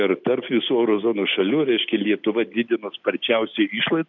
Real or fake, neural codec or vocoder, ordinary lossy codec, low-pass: real; none; MP3, 64 kbps; 7.2 kHz